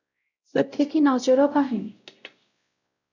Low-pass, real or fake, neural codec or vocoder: 7.2 kHz; fake; codec, 16 kHz, 0.5 kbps, X-Codec, WavLM features, trained on Multilingual LibriSpeech